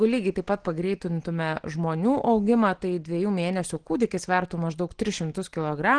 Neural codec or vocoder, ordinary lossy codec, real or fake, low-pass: none; Opus, 16 kbps; real; 9.9 kHz